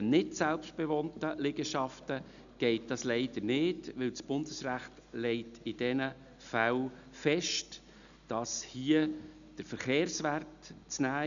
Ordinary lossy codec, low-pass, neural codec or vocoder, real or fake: none; 7.2 kHz; none; real